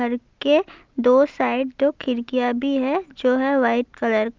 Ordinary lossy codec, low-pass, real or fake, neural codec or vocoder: Opus, 24 kbps; 7.2 kHz; real; none